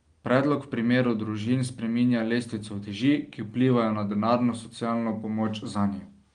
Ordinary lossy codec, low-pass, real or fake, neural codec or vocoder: Opus, 24 kbps; 9.9 kHz; real; none